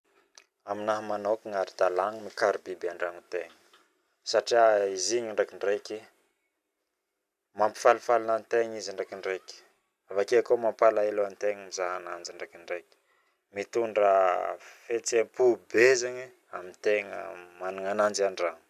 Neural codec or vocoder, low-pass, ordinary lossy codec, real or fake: none; 14.4 kHz; none; real